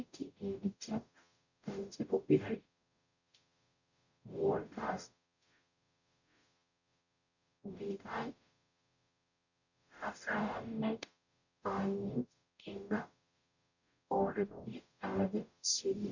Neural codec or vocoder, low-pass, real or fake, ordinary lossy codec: codec, 44.1 kHz, 0.9 kbps, DAC; 7.2 kHz; fake; none